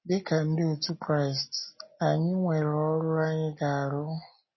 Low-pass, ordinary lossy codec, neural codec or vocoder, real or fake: 7.2 kHz; MP3, 24 kbps; none; real